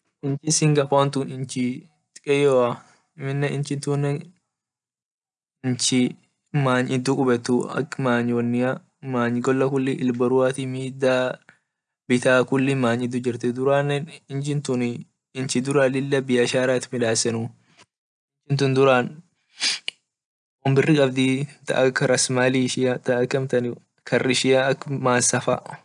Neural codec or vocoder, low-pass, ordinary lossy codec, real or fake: none; 9.9 kHz; none; real